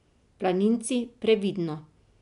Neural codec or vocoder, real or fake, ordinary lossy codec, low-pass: none; real; none; 10.8 kHz